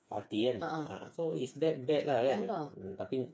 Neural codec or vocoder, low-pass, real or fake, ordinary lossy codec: codec, 16 kHz, 4 kbps, FreqCodec, smaller model; none; fake; none